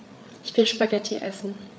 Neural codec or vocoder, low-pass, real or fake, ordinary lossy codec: codec, 16 kHz, 8 kbps, FreqCodec, larger model; none; fake; none